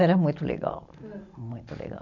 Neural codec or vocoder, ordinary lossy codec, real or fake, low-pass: none; MP3, 64 kbps; real; 7.2 kHz